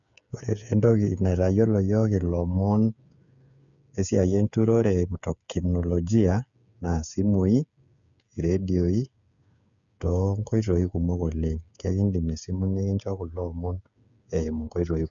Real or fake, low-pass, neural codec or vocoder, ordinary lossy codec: fake; 7.2 kHz; codec, 16 kHz, 8 kbps, FreqCodec, smaller model; none